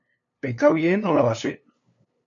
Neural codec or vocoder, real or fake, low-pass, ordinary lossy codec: codec, 16 kHz, 2 kbps, FunCodec, trained on LibriTTS, 25 frames a second; fake; 7.2 kHz; AAC, 64 kbps